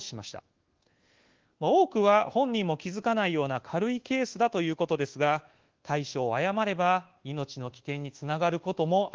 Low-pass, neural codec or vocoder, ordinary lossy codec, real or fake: 7.2 kHz; codec, 24 kHz, 1.2 kbps, DualCodec; Opus, 16 kbps; fake